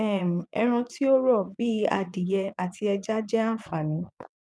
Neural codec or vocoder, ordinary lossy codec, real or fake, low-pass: vocoder, 22.05 kHz, 80 mel bands, Vocos; none; fake; none